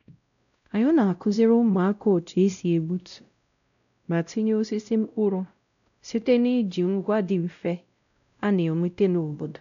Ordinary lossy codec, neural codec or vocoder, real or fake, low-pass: none; codec, 16 kHz, 0.5 kbps, X-Codec, WavLM features, trained on Multilingual LibriSpeech; fake; 7.2 kHz